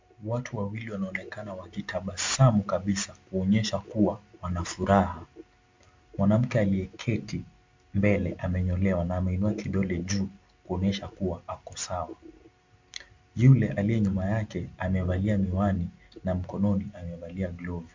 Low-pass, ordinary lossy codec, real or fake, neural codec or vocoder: 7.2 kHz; MP3, 64 kbps; real; none